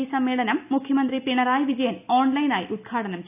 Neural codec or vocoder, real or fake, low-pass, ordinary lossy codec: none; real; 3.6 kHz; none